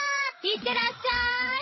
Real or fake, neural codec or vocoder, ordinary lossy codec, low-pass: fake; codec, 16 kHz, 16 kbps, FreqCodec, larger model; MP3, 24 kbps; 7.2 kHz